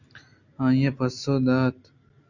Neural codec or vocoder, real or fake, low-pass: none; real; 7.2 kHz